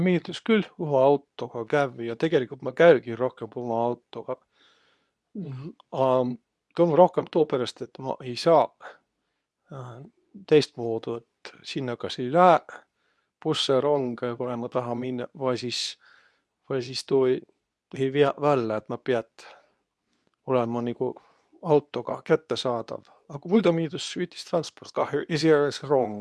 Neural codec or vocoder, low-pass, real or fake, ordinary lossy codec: codec, 24 kHz, 0.9 kbps, WavTokenizer, medium speech release version 2; none; fake; none